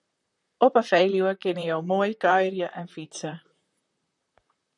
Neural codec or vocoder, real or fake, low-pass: vocoder, 44.1 kHz, 128 mel bands, Pupu-Vocoder; fake; 10.8 kHz